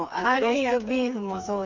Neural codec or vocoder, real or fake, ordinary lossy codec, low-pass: codec, 16 kHz, 4 kbps, FreqCodec, smaller model; fake; none; 7.2 kHz